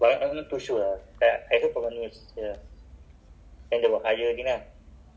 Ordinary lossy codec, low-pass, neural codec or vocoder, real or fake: none; none; none; real